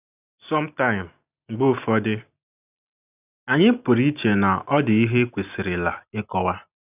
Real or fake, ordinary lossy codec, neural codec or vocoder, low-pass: real; none; none; 3.6 kHz